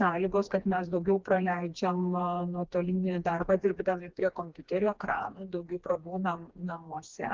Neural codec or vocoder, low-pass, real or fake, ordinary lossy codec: codec, 16 kHz, 2 kbps, FreqCodec, smaller model; 7.2 kHz; fake; Opus, 32 kbps